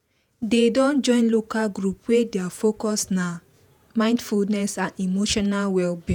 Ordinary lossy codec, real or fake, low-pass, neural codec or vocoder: none; fake; none; vocoder, 48 kHz, 128 mel bands, Vocos